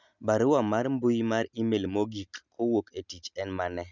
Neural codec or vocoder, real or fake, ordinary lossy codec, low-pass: none; real; none; 7.2 kHz